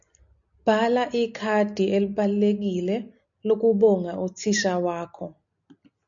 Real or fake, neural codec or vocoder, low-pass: real; none; 7.2 kHz